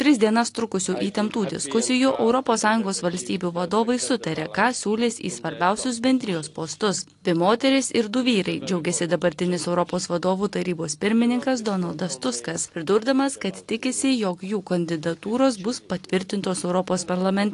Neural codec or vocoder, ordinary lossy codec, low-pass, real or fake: none; AAC, 48 kbps; 10.8 kHz; real